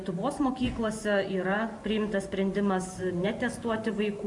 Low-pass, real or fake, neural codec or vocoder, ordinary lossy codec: 10.8 kHz; fake; vocoder, 44.1 kHz, 128 mel bands every 512 samples, BigVGAN v2; MP3, 48 kbps